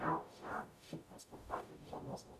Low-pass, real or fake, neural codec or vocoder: 14.4 kHz; fake; codec, 44.1 kHz, 0.9 kbps, DAC